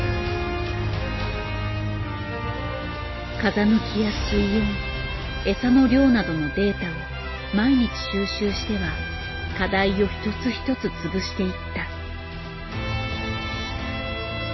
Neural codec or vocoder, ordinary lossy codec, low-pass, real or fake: none; MP3, 24 kbps; 7.2 kHz; real